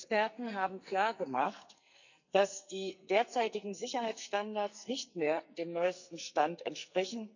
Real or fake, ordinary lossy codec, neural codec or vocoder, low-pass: fake; none; codec, 44.1 kHz, 2.6 kbps, SNAC; 7.2 kHz